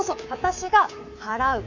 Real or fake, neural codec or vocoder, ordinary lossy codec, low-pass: fake; codec, 24 kHz, 3.1 kbps, DualCodec; none; 7.2 kHz